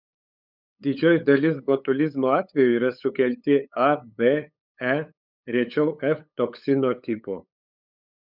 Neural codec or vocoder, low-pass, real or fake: codec, 16 kHz, 8 kbps, FunCodec, trained on LibriTTS, 25 frames a second; 5.4 kHz; fake